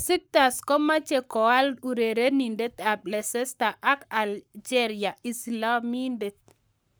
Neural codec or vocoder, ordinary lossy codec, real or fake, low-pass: codec, 44.1 kHz, 7.8 kbps, Pupu-Codec; none; fake; none